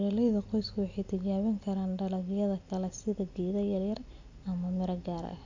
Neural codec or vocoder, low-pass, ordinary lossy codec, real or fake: none; 7.2 kHz; AAC, 48 kbps; real